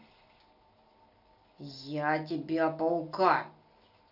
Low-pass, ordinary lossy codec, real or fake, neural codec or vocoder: 5.4 kHz; none; real; none